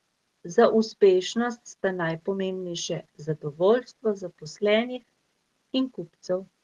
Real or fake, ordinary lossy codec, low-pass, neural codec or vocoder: real; Opus, 16 kbps; 19.8 kHz; none